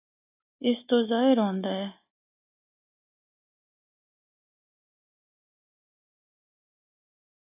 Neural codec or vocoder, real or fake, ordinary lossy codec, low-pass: none; real; AAC, 24 kbps; 3.6 kHz